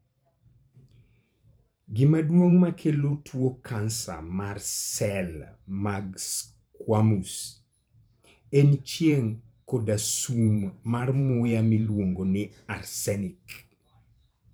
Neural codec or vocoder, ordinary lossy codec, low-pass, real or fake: vocoder, 44.1 kHz, 128 mel bands every 512 samples, BigVGAN v2; none; none; fake